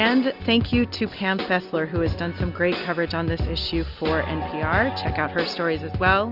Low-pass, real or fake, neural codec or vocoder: 5.4 kHz; real; none